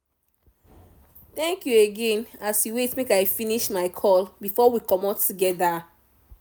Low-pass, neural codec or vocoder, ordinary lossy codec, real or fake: none; none; none; real